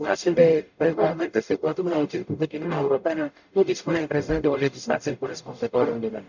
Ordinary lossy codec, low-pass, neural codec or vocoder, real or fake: none; 7.2 kHz; codec, 44.1 kHz, 0.9 kbps, DAC; fake